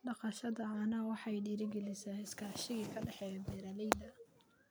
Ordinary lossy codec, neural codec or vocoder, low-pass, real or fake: none; none; none; real